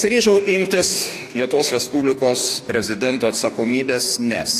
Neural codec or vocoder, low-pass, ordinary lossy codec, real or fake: codec, 44.1 kHz, 2.6 kbps, DAC; 14.4 kHz; AAC, 64 kbps; fake